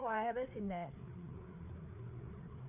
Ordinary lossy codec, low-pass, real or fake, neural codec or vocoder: none; 3.6 kHz; fake; codec, 16 kHz, 4 kbps, FreqCodec, larger model